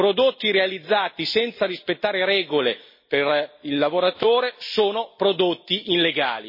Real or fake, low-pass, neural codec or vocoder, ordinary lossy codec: real; 5.4 kHz; none; MP3, 24 kbps